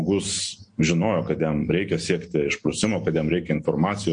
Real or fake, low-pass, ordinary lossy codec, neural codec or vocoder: real; 9.9 kHz; MP3, 48 kbps; none